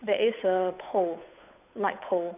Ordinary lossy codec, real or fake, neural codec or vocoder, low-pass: none; fake; codec, 16 kHz, 8 kbps, FunCodec, trained on Chinese and English, 25 frames a second; 3.6 kHz